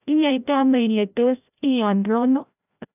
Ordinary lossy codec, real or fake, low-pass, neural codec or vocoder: none; fake; 3.6 kHz; codec, 16 kHz, 0.5 kbps, FreqCodec, larger model